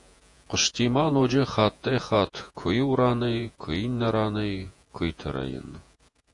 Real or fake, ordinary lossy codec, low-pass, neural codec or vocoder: fake; AAC, 64 kbps; 10.8 kHz; vocoder, 48 kHz, 128 mel bands, Vocos